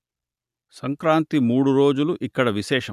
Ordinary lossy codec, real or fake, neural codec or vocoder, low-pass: none; real; none; 14.4 kHz